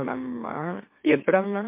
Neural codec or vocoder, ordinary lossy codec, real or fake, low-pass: autoencoder, 44.1 kHz, a latent of 192 numbers a frame, MeloTTS; MP3, 24 kbps; fake; 3.6 kHz